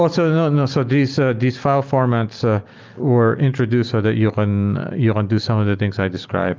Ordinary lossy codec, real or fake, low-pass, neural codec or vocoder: Opus, 32 kbps; real; 7.2 kHz; none